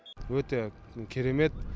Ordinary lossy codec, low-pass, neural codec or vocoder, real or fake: none; none; none; real